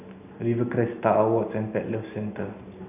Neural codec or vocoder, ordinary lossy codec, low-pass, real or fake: none; none; 3.6 kHz; real